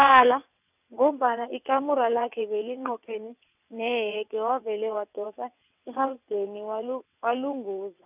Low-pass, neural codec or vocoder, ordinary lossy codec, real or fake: 3.6 kHz; vocoder, 22.05 kHz, 80 mel bands, WaveNeXt; none; fake